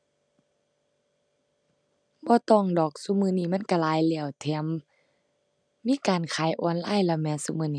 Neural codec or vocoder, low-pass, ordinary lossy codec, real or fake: none; 9.9 kHz; none; real